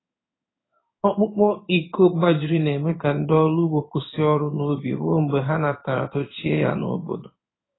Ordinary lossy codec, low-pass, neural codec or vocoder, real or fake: AAC, 16 kbps; 7.2 kHz; codec, 16 kHz in and 24 kHz out, 1 kbps, XY-Tokenizer; fake